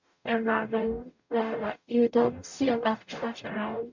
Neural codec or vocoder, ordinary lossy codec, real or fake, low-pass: codec, 44.1 kHz, 0.9 kbps, DAC; none; fake; 7.2 kHz